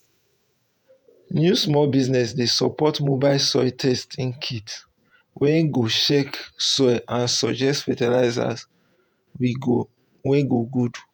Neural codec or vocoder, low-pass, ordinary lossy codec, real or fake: vocoder, 48 kHz, 128 mel bands, Vocos; none; none; fake